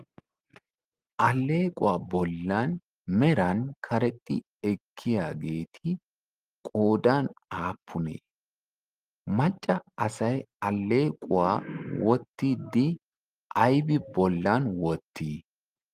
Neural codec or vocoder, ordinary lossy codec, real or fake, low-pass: none; Opus, 32 kbps; real; 14.4 kHz